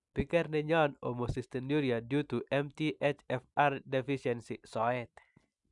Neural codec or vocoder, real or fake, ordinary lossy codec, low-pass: none; real; none; 10.8 kHz